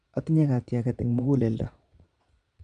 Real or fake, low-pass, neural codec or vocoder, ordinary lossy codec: fake; 9.9 kHz; vocoder, 22.05 kHz, 80 mel bands, Vocos; MP3, 64 kbps